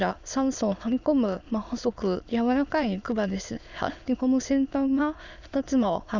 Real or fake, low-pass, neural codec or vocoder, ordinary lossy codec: fake; 7.2 kHz; autoencoder, 22.05 kHz, a latent of 192 numbers a frame, VITS, trained on many speakers; none